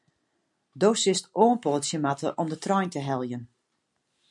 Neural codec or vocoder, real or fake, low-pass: none; real; 10.8 kHz